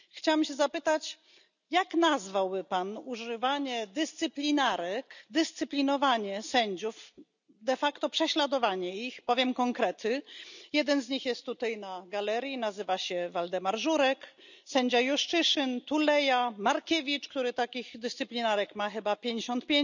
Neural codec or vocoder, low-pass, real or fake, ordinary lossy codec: none; 7.2 kHz; real; none